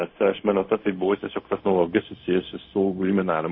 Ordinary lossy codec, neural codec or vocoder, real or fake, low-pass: MP3, 24 kbps; codec, 16 kHz, 0.4 kbps, LongCat-Audio-Codec; fake; 7.2 kHz